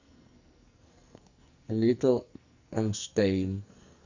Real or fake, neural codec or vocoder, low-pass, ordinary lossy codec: fake; codec, 44.1 kHz, 2.6 kbps, SNAC; 7.2 kHz; Opus, 64 kbps